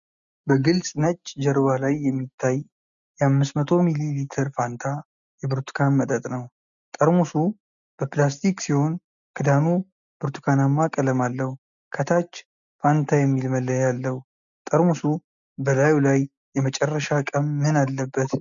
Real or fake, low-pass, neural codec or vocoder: real; 7.2 kHz; none